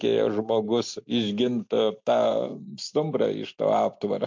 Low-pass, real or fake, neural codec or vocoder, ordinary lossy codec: 7.2 kHz; real; none; MP3, 48 kbps